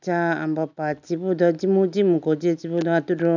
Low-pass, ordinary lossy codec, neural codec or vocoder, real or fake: 7.2 kHz; none; none; real